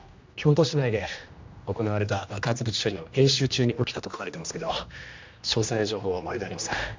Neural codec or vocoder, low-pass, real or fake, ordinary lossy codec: codec, 16 kHz, 1 kbps, X-Codec, HuBERT features, trained on general audio; 7.2 kHz; fake; MP3, 64 kbps